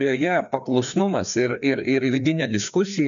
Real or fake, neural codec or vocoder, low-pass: fake; codec, 16 kHz, 2 kbps, FreqCodec, larger model; 7.2 kHz